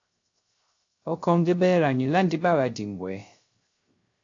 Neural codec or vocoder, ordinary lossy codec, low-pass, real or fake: codec, 16 kHz, 0.3 kbps, FocalCodec; AAC, 48 kbps; 7.2 kHz; fake